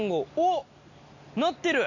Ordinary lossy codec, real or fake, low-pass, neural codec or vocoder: none; real; 7.2 kHz; none